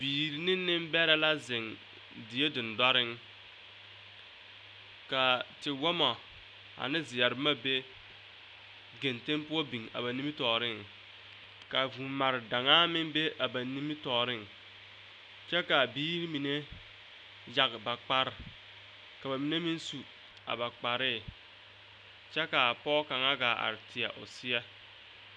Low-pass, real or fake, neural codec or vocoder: 9.9 kHz; real; none